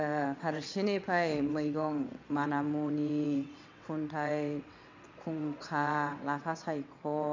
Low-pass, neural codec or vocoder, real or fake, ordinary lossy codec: 7.2 kHz; vocoder, 22.05 kHz, 80 mel bands, WaveNeXt; fake; MP3, 64 kbps